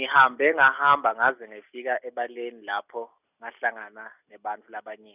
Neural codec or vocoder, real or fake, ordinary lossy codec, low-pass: none; real; none; 3.6 kHz